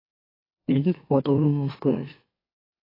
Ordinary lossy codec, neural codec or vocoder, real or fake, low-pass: AAC, 24 kbps; autoencoder, 44.1 kHz, a latent of 192 numbers a frame, MeloTTS; fake; 5.4 kHz